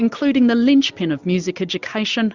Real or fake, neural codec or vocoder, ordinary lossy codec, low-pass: real; none; Opus, 64 kbps; 7.2 kHz